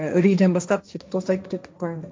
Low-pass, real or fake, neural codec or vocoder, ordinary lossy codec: none; fake; codec, 16 kHz, 1.1 kbps, Voila-Tokenizer; none